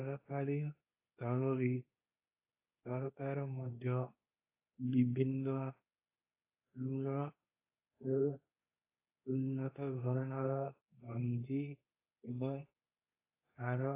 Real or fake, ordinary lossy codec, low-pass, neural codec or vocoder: fake; AAC, 24 kbps; 3.6 kHz; codec, 24 kHz, 0.9 kbps, WavTokenizer, medium speech release version 2